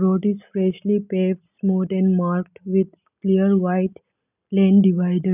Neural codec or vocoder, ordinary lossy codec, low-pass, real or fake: none; none; 3.6 kHz; real